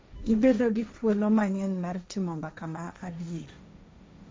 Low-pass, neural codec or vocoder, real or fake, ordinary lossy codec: none; codec, 16 kHz, 1.1 kbps, Voila-Tokenizer; fake; none